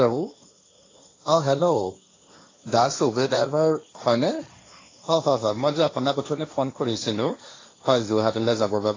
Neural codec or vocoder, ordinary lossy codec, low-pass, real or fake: codec, 16 kHz, 1.1 kbps, Voila-Tokenizer; AAC, 32 kbps; 7.2 kHz; fake